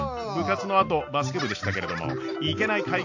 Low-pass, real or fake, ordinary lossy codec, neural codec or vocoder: 7.2 kHz; real; AAC, 48 kbps; none